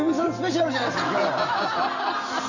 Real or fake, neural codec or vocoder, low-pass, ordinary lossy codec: real; none; 7.2 kHz; none